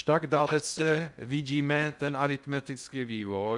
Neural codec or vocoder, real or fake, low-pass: codec, 16 kHz in and 24 kHz out, 0.6 kbps, FocalCodec, streaming, 2048 codes; fake; 10.8 kHz